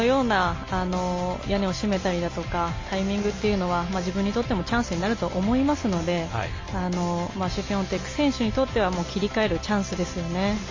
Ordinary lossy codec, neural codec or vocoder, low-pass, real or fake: MP3, 32 kbps; none; 7.2 kHz; real